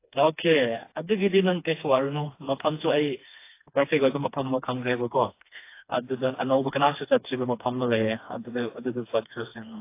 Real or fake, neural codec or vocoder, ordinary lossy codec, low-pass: fake; codec, 16 kHz, 2 kbps, FreqCodec, smaller model; AAC, 24 kbps; 3.6 kHz